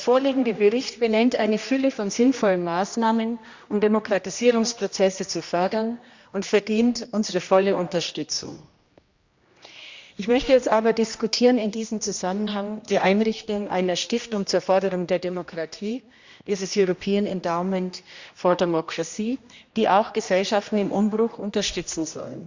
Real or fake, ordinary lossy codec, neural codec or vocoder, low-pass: fake; Opus, 64 kbps; codec, 16 kHz, 1 kbps, X-Codec, HuBERT features, trained on general audio; 7.2 kHz